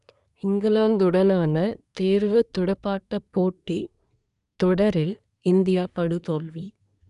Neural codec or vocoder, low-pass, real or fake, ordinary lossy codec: codec, 24 kHz, 1 kbps, SNAC; 10.8 kHz; fake; none